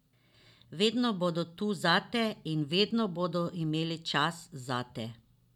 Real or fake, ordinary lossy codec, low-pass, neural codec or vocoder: real; none; 19.8 kHz; none